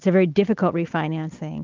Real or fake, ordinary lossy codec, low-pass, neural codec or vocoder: real; Opus, 32 kbps; 7.2 kHz; none